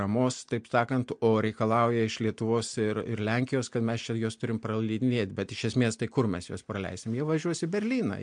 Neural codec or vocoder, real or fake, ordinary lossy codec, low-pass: none; real; MP3, 64 kbps; 9.9 kHz